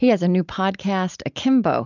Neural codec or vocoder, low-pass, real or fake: none; 7.2 kHz; real